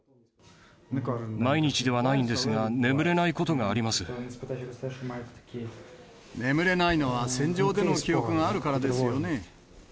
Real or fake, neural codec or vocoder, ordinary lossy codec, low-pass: real; none; none; none